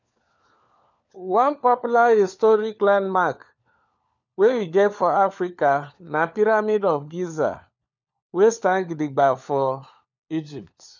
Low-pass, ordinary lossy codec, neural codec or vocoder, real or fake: 7.2 kHz; none; codec, 16 kHz, 4 kbps, FunCodec, trained on LibriTTS, 50 frames a second; fake